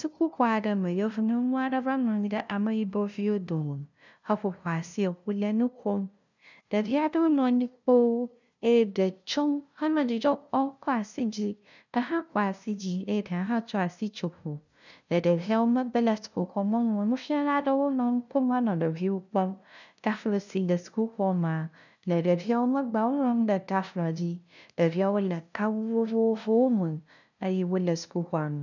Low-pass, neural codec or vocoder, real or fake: 7.2 kHz; codec, 16 kHz, 0.5 kbps, FunCodec, trained on LibriTTS, 25 frames a second; fake